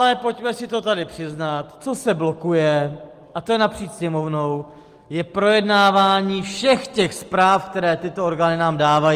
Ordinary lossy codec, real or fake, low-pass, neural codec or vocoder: Opus, 24 kbps; real; 14.4 kHz; none